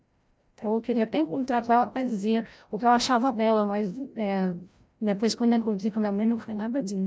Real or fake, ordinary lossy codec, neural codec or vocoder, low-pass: fake; none; codec, 16 kHz, 0.5 kbps, FreqCodec, larger model; none